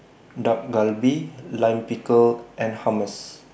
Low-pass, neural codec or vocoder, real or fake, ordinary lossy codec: none; none; real; none